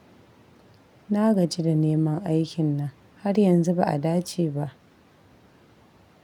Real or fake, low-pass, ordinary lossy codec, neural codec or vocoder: real; 19.8 kHz; none; none